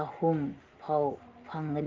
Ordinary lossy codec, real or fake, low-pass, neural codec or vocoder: Opus, 32 kbps; real; 7.2 kHz; none